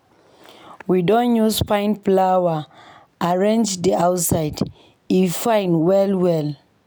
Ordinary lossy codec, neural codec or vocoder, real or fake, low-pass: none; none; real; none